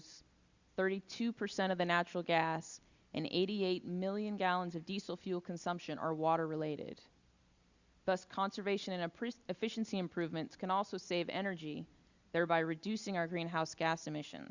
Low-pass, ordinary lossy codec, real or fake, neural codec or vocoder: 7.2 kHz; Opus, 64 kbps; real; none